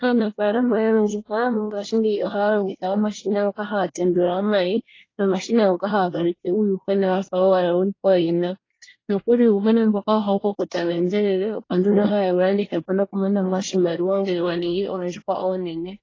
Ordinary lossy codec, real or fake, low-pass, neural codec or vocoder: AAC, 32 kbps; fake; 7.2 kHz; codec, 24 kHz, 1 kbps, SNAC